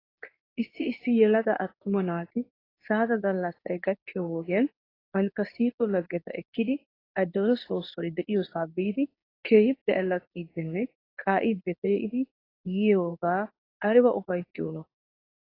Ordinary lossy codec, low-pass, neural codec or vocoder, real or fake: AAC, 24 kbps; 5.4 kHz; codec, 24 kHz, 0.9 kbps, WavTokenizer, medium speech release version 2; fake